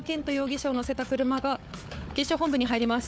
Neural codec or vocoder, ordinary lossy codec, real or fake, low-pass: codec, 16 kHz, 4 kbps, FunCodec, trained on Chinese and English, 50 frames a second; none; fake; none